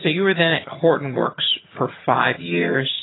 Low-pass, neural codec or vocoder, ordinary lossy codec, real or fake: 7.2 kHz; vocoder, 22.05 kHz, 80 mel bands, HiFi-GAN; AAC, 16 kbps; fake